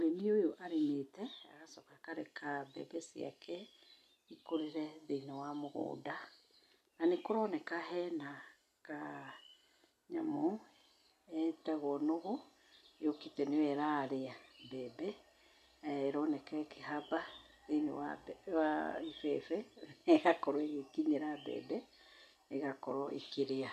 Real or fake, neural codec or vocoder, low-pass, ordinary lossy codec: real; none; 14.4 kHz; none